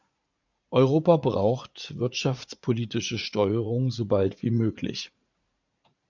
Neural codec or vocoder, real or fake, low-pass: vocoder, 44.1 kHz, 80 mel bands, Vocos; fake; 7.2 kHz